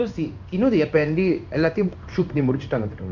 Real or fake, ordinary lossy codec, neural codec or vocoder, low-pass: fake; none; codec, 16 kHz in and 24 kHz out, 1 kbps, XY-Tokenizer; 7.2 kHz